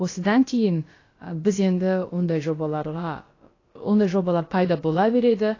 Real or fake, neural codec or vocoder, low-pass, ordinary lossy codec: fake; codec, 16 kHz, about 1 kbps, DyCAST, with the encoder's durations; 7.2 kHz; AAC, 32 kbps